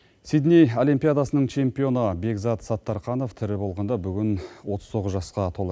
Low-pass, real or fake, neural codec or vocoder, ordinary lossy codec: none; real; none; none